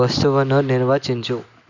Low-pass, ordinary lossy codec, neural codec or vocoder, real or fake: 7.2 kHz; none; none; real